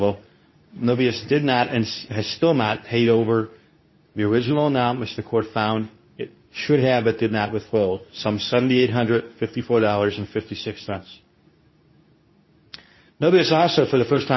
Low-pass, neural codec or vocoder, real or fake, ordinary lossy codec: 7.2 kHz; codec, 24 kHz, 0.9 kbps, WavTokenizer, medium speech release version 2; fake; MP3, 24 kbps